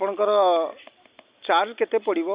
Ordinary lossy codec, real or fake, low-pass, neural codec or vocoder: Opus, 64 kbps; real; 3.6 kHz; none